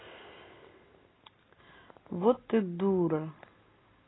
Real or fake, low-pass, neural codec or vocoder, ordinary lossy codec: real; 7.2 kHz; none; AAC, 16 kbps